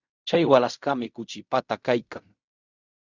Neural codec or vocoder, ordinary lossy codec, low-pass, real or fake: codec, 16 kHz in and 24 kHz out, 0.4 kbps, LongCat-Audio-Codec, fine tuned four codebook decoder; Opus, 64 kbps; 7.2 kHz; fake